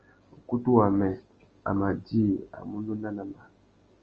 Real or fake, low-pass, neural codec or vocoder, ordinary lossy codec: real; 7.2 kHz; none; Opus, 24 kbps